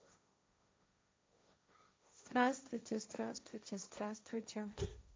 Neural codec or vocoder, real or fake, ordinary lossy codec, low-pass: codec, 16 kHz, 1.1 kbps, Voila-Tokenizer; fake; none; none